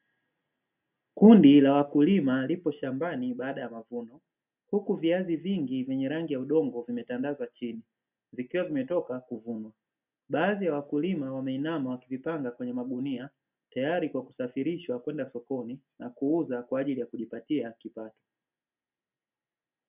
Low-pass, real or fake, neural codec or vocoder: 3.6 kHz; real; none